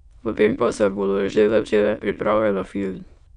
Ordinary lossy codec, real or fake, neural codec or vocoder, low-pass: none; fake; autoencoder, 22.05 kHz, a latent of 192 numbers a frame, VITS, trained on many speakers; 9.9 kHz